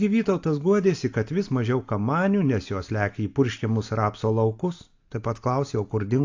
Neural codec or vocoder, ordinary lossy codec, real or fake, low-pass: none; AAC, 48 kbps; real; 7.2 kHz